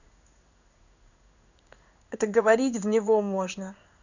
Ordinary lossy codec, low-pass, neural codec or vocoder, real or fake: none; 7.2 kHz; codec, 16 kHz in and 24 kHz out, 1 kbps, XY-Tokenizer; fake